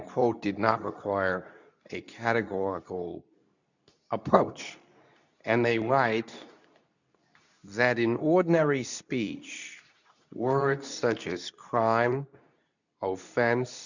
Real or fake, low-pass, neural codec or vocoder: fake; 7.2 kHz; codec, 24 kHz, 0.9 kbps, WavTokenizer, medium speech release version 2